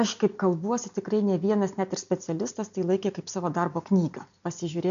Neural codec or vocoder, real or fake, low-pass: none; real; 7.2 kHz